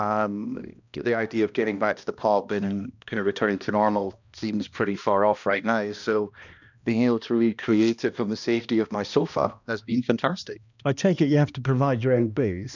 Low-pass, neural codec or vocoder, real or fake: 7.2 kHz; codec, 16 kHz, 1 kbps, X-Codec, HuBERT features, trained on balanced general audio; fake